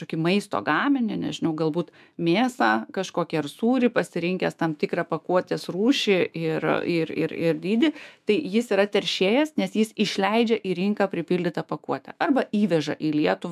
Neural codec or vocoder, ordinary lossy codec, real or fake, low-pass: autoencoder, 48 kHz, 128 numbers a frame, DAC-VAE, trained on Japanese speech; MP3, 96 kbps; fake; 14.4 kHz